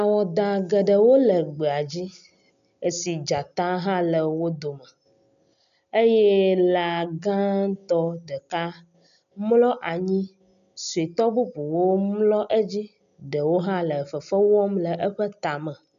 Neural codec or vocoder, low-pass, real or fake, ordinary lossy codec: none; 7.2 kHz; real; MP3, 96 kbps